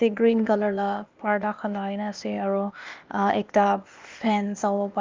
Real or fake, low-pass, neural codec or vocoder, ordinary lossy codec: fake; 7.2 kHz; codec, 16 kHz, 0.8 kbps, ZipCodec; Opus, 32 kbps